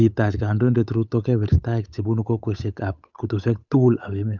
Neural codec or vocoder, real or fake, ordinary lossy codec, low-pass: codec, 16 kHz, 8 kbps, FunCodec, trained on Chinese and English, 25 frames a second; fake; none; 7.2 kHz